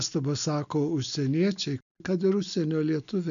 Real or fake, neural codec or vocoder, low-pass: real; none; 7.2 kHz